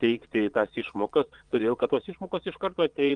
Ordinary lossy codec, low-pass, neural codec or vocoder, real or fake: Opus, 32 kbps; 9.9 kHz; vocoder, 22.05 kHz, 80 mel bands, Vocos; fake